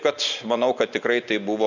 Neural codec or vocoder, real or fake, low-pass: none; real; 7.2 kHz